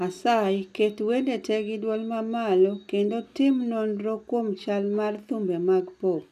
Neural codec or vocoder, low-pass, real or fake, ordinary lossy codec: none; 14.4 kHz; real; none